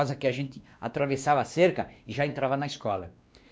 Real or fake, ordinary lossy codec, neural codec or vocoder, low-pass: fake; none; codec, 16 kHz, 2 kbps, X-Codec, WavLM features, trained on Multilingual LibriSpeech; none